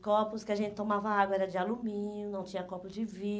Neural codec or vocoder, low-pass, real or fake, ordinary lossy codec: none; none; real; none